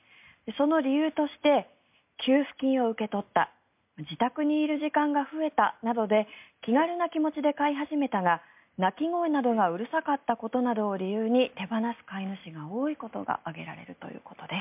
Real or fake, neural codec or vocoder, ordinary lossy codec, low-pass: real; none; MP3, 32 kbps; 3.6 kHz